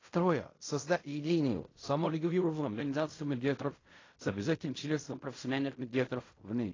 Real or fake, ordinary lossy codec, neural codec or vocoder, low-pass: fake; AAC, 32 kbps; codec, 16 kHz in and 24 kHz out, 0.4 kbps, LongCat-Audio-Codec, fine tuned four codebook decoder; 7.2 kHz